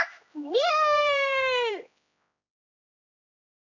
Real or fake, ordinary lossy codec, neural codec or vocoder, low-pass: fake; none; codec, 16 kHz, 1 kbps, X-Codec, HuBERT features, trained on general audio; 7.2 kHz